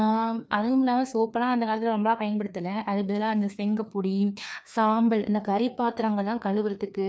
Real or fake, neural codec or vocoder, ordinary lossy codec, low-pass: fake; codec, 16 kHz, 2 kbps, FreqCodec, larger model; none; none